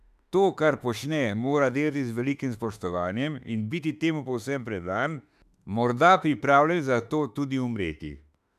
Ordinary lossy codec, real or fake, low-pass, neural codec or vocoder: none; fake; 14.4 kHz; autoencoder, 48 kHz, 32 numbers a frame, DAC-VAE, trained on Japanese speech